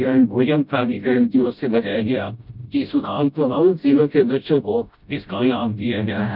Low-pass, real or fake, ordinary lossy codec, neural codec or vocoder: 5.4 kHz; fake; none; codec, 16 kHz, 0.5 kbps, FreqCodec, smaller model